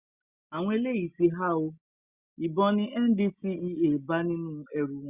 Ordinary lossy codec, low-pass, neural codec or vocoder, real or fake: Opus, 64 kbps; 3.6 kHz; none; real